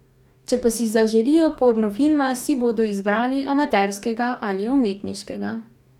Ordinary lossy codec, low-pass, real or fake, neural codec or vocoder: none; 19.8 kHz; fake; codec, 44.1 kHz, 2.6 kbps, DAC